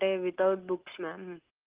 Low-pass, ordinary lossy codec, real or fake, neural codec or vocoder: 3.6 kHz; Opus, 32 kbps; real; none